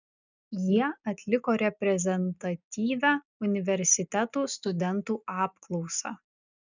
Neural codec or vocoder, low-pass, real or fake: none; 7.2 kHz; real